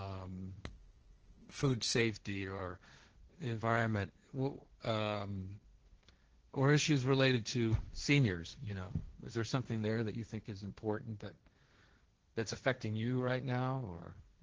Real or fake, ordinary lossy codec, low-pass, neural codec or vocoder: fake; Opus, 16 kbps; 7.2 kHz; codec, 16 kHz, 1.1 kbps, Voila-Tokenizer